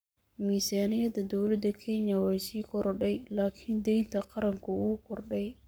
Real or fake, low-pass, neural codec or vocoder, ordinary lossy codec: fake; none; codec, 44.1 kHz, 7.8 kbps, Pupu-Codec; none